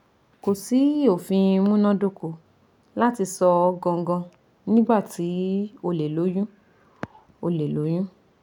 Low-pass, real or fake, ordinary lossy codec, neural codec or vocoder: 19.8 kHz; real; none; none